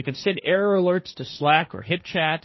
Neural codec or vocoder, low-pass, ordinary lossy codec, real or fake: codec, 16 kHz, 1.1 kbps, Voila-Tokenizer; 7.2 kHz; MP3, 24 kbps; fake